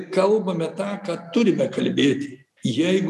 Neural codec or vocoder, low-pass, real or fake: none; 14.4 kHz; real